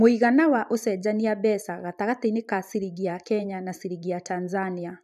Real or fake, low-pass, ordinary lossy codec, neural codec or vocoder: fake; 14.4 kHz; none; vocoder, 44.1 kHz, 128 mel bands every 512 samples, BigVGAN v2